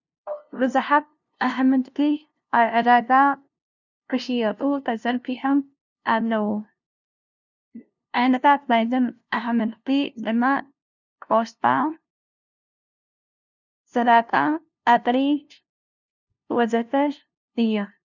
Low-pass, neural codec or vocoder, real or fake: 7.2 kHz; codec, 16 kHz, 0.5 kbps, FunCodec, trained on LibriTTS, 25 frames a second; fake